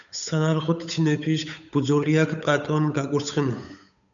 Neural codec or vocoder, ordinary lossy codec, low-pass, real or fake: codec, 16 kHz, 8 kbps, FunCodec, trained on Chinese and English, 25 frames a second; MP3, 96 kbps; 7.2 kHz; fake